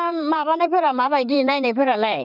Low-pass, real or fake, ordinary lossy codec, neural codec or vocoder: 5.4 kHz; fake; none; codec, 16 kHz in and 24 kHz out, 2.2 kbps, FireRedTTS-2 codec